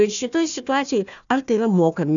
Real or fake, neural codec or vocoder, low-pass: fake; codec, 16 kHz, 1 kbps, FunCodec, trained on Chinese and English, 50 frames a second; 7.2 kHz